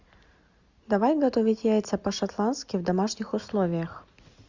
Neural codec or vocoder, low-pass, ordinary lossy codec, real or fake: none; 7.2 kHz; Opus, 64 kbps; real